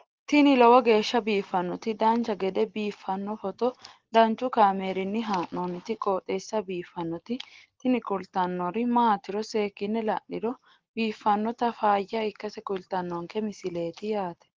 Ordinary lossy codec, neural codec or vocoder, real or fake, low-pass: Opus, 16 kbps; none; real; 7.2 kHz